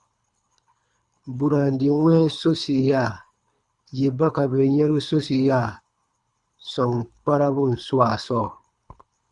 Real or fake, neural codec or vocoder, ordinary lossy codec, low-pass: fake; codec, 24 kHz, 3 kbps, HILCodec; Opus, 64 kbps; 10.8 kHz